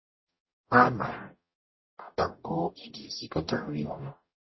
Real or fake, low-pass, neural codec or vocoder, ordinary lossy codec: fake; 7.2 kHz; codec, 44.1 kHz, 0.9 kbps, DAC; MP3, 24 kbps